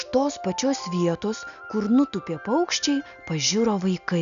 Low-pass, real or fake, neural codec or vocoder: 7.2 kHz; real; none